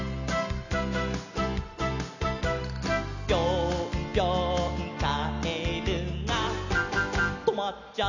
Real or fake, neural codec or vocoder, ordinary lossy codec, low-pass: real; none; none; 7.2 kHz